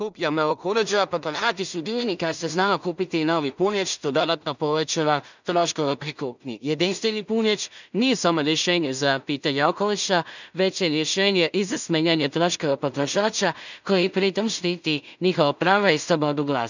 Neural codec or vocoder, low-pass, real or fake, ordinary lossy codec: codec, 16 kHz in and 24 kHz out, 0.4 kbps, LongCat-Audio-Codec, two codebook decoder; 7.2 kHz; fake; none